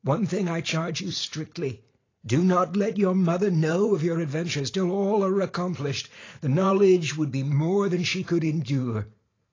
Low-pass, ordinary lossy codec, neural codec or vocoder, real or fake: 7.2 kHz; AAC, 32 kbps; vocoder, 44.1 kHz, 128 mel bands every 512 samples, BigVGAN v2; fake